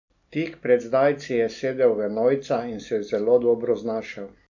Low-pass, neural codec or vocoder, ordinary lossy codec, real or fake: 7.2 kHz; none; none; real